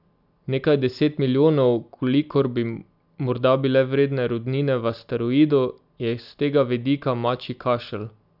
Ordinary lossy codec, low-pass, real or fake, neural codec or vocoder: none; 5.4 kHz; real; none